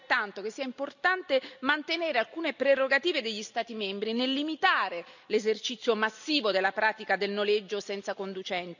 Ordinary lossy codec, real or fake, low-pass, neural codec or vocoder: none; real; 7.2 kHz; none